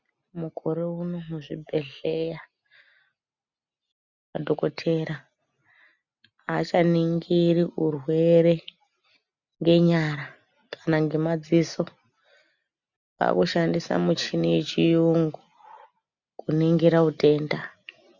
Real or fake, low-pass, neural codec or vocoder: real; 7.2 kHz; none